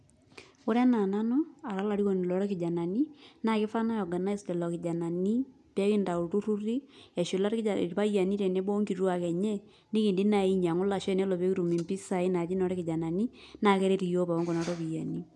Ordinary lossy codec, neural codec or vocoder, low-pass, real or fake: none; none; none; real